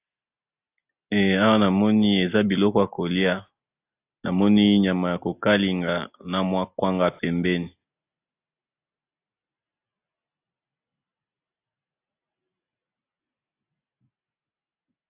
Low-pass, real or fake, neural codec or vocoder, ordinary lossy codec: 3.6 kHz; real; none; AAC, 32 kbps